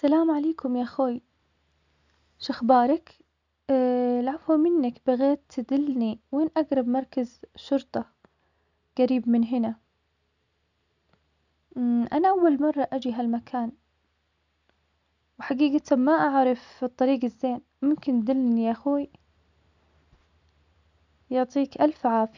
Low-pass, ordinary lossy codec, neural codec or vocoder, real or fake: 7.2 kHz; none; none; real